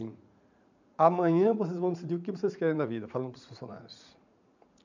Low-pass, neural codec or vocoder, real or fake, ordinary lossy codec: 7.2 kHz; vocoder, 44.1 kHz, 80 mel bands, Vocos; fake; none